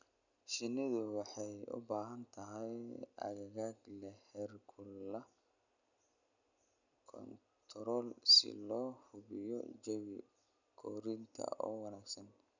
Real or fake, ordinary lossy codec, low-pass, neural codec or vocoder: real; none; 7.2 kHz; none